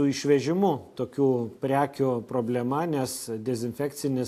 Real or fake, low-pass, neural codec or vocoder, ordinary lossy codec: real; 14.4 kHz; none; AAC, 64 kbps